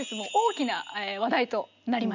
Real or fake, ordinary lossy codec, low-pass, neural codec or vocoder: real; none; 7.2 kHz; none